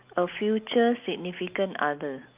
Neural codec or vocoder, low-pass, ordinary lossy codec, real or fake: none; 3.6 kHz; Opus, 24 kbps; real